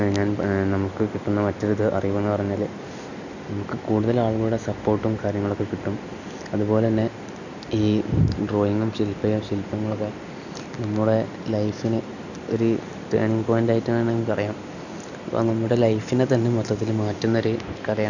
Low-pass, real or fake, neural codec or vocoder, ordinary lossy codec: 7.2 kHz; real; none; none